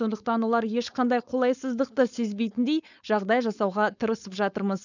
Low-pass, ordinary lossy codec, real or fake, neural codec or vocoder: 7.2 kHz; none; fake; codec, 16 kHz, 4.8 kbps, FACodec